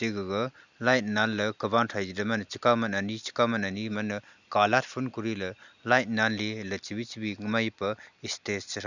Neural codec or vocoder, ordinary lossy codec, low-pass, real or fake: none; none; 7.2 kHz; real